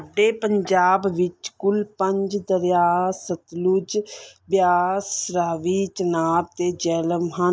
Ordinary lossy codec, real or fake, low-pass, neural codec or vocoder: none; real; none; none